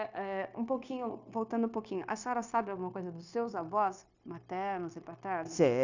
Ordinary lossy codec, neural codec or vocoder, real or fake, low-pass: none; codec, 16 kHz, 0.9 kbps, LongCat-Audio-Codec; fake; 7.2 kHz